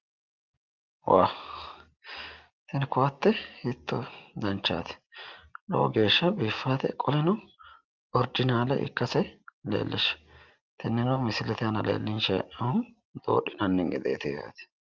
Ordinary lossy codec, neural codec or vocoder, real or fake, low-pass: Opus, 24 kbps; none; real; 7.2 kHz